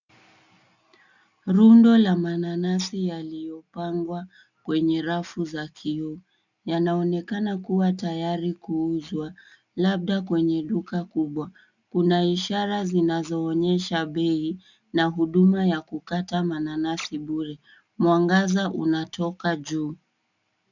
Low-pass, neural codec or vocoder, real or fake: 7.2 kHz; none; real